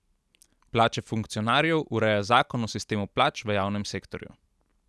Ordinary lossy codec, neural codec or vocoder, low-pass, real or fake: none; none; none; real